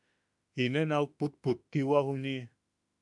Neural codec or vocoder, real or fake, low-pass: autoencoder, 48 kHz, 32 numbers a frame, DAC-VAE, trained on Japanese speech; fake; 10.8 kHz